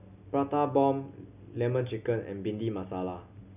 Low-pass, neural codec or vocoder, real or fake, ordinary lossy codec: 3.6 kHz; none; real; none